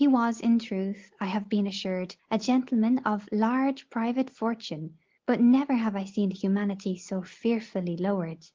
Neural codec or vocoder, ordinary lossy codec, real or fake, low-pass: none; Opus, 16 kbps; real; 7.2 kHz